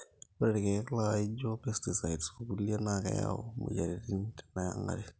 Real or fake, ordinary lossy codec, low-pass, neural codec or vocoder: real; none; none; none